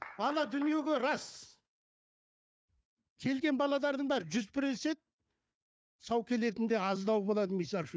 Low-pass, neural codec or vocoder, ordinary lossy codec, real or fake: none; codec, 16 kHz, 4 kbps, FunCodec, trained on Chinese and English, 50 frames a second; none; fake